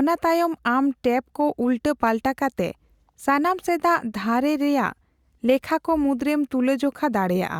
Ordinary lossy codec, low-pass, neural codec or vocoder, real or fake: Opus, 64 kbps; 19.8 kHz; none; real